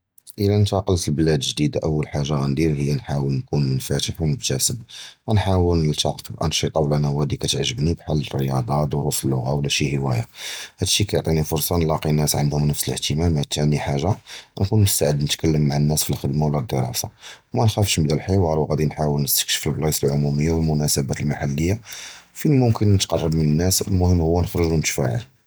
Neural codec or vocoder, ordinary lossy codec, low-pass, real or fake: none; none; none; real